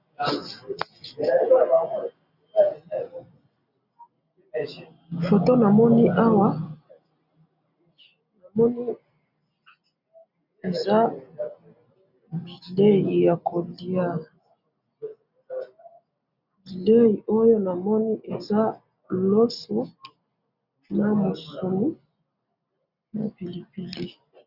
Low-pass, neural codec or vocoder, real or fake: 5.4 kHz; none; real